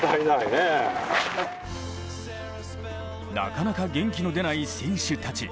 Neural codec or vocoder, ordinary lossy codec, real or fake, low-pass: none; none; real; none